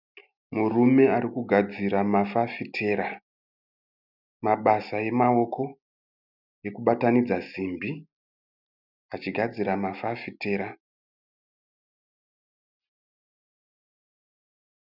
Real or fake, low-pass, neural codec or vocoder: real; 5.4 kHz; none